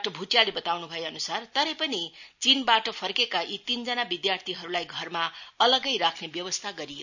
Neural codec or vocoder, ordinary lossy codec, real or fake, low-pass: none; none; real; 7.2 kHz